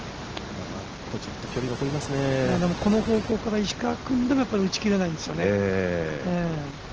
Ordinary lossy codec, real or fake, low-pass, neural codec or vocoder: Opus, 16 kbps; real; 7.2 kHz; none